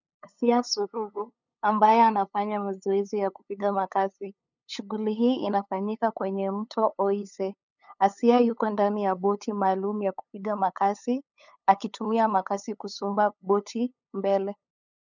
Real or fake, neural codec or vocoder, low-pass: fake; codec, 16 kHz, 8 kbps, FunCodec, trained on LibriTTS, 25 frames a second; 7.2 kHz